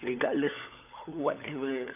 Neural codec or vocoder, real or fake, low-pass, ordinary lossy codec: codec, 16 kHz, 16 kbps, FunCodec, trained on LibriTTS, 50 frames a second; fake; 3.6 kHz; none